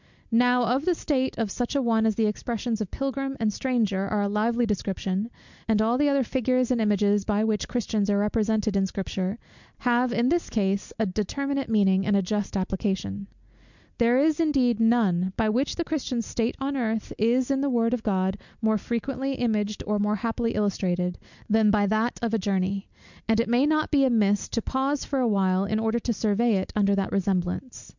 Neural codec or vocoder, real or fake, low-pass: none; real; 7.2 kHz